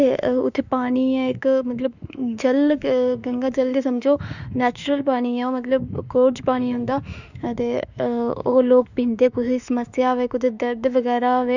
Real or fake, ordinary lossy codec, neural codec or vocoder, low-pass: fake; none; autoencoder, 48 kHz, 32 numbers a frame, DAC-VAE, trained on Japanese speech; 7.2 kHz